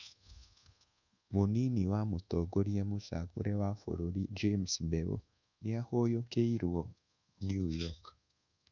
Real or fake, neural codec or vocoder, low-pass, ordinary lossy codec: fake; codec, 24 kHz, 1.2 kbps, DualCodec; 7.2 kHz; none